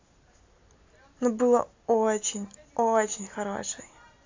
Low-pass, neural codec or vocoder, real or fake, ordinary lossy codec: 7.2 kHz; none; real; AAC, 48 kbps